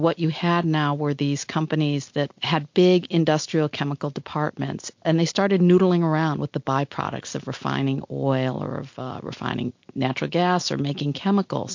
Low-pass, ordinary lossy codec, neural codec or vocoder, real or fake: 7.2 kHz; MP3, 48 kbps; none; real